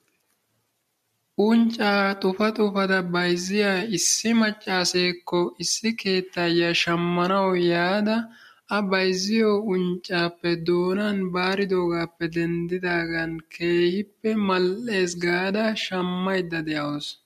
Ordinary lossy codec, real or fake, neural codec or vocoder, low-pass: MP3, 64 kbps; real; none; 14.4 kHz